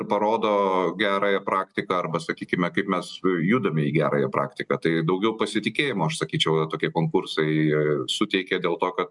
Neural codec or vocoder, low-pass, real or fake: none; 10.8 kHz; real